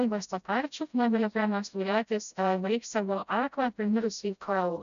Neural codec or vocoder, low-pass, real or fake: codec, 16 kHz, 0.5 kbps, FreqCodec, smaller model; 7.2 kHz; fake